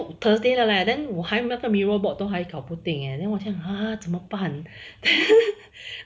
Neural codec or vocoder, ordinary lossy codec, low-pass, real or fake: none; none; none; real